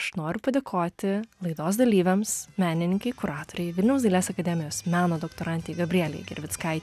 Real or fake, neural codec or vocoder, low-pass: real; none; 14.4 kHz